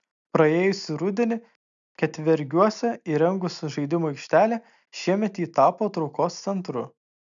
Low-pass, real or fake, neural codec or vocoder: 7.2 kHz; real; none